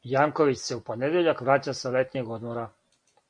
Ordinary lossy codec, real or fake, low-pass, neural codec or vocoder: MP3, 48 kbps; real; 9.9 kHz; none